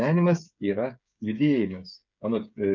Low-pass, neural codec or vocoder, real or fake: 7.2 kHz; codec, 16 kHz, 8 kbps, FreqCodec, smaller model; fake